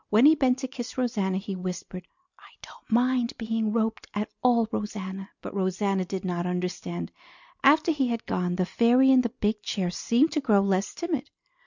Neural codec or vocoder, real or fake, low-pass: none; real; 7.2 kHz